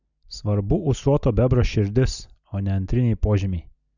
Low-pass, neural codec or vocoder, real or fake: 7.2 kHz; none; real